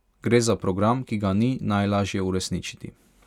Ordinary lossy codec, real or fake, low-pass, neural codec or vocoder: none; real; 19.8 kHz; none